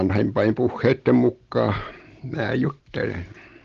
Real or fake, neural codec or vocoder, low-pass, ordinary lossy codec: real; none; 7.2 kHz; Opus, 16 kbps